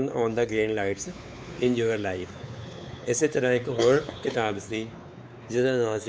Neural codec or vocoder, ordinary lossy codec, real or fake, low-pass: codec, 16 kHz, 4 kbps, X-Codec, WavLM features, trained on Multilingual LibriSpeech; none; fake; none